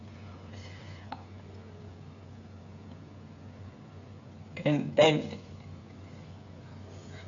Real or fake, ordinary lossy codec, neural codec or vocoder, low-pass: fake; AAC, 48 kbps; codec, 16 kHz, 8 kbps, FreqCodec, smaller model; 7.2 kHz